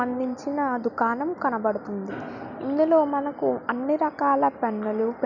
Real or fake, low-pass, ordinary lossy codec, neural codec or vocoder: real; 7.2 kHz; none; none